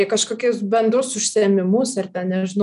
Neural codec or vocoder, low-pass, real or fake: none; 10.8 kHz; real